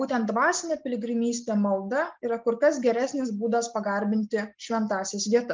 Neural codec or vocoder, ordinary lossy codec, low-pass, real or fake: none; Opus, 32 kbps; 7.2 kHz; real